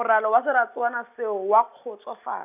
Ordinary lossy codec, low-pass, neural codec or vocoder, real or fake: none; 3.6 kHz; none; real